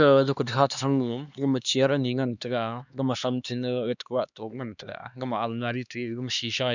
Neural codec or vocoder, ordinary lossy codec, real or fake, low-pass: codec, 16 kHz, 2 kbps, X-Codec, HuBERT features, trained on LibriSpeech; none; fake; 7.2 kHz